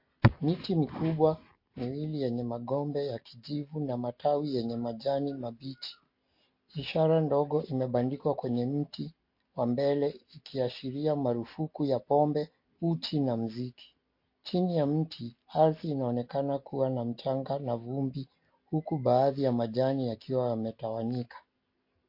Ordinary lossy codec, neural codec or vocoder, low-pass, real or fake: MP3, 32 kbps; none; 5.4 kHz; real